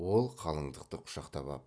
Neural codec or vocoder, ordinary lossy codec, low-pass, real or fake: none; none; none; real